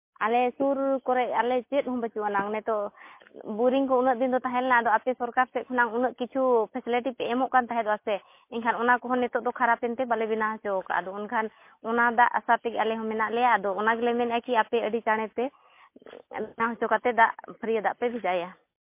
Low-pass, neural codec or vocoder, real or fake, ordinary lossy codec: 3.6 kHz; none; real; MP3, 24 kbps